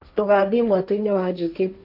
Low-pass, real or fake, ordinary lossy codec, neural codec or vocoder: 5.4 kHz; fake; none; codec, 16 kHz, 1.1 kbps, Voila-Tokenizer